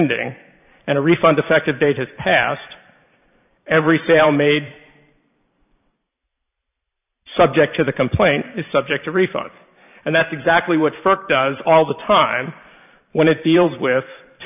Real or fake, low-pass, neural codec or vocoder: fake; 3.6 kHz; vocoder, 44.1 kHz, 128 mel bands every 512 samples, BigVGAN v2